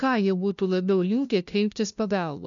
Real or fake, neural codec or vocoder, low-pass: fake; codec, 16 kHz, 0.5 kbps, FunCodec, trained on LibriTTS, 25 frames a second; 7.2 kHz